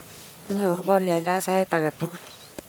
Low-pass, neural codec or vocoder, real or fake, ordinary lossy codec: none; codec, 44.1 kHz, 1.7 kbps, Pupu-Codec; fake; none